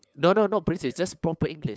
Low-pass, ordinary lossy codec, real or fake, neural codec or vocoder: none; none; fake; codec, 16 kHz, 8 kbps, FunCodec, trained on LibriTTS, 25 frames a second